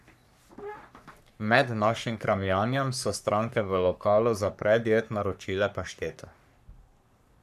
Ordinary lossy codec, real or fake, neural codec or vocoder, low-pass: none; fake; codec, 44.1 kHz, 3.4 kbps, Pupu-Codec; 14.4 kHz